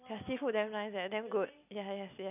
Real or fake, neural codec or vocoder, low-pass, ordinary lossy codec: real; none; 3.6 kHz; none